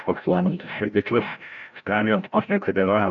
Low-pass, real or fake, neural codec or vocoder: 7.2 kHz; fake; codec, 16 kHz, 0.5 kbps, FreqCodec, larger model